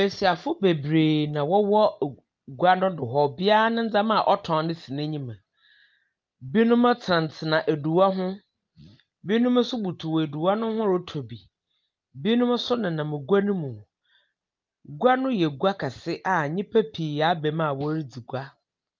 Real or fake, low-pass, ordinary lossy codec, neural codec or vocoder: real; 7.2 kHz; Opus, 32 kbps; none